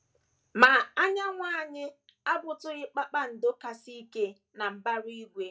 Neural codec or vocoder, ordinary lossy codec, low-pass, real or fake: none; none; none; real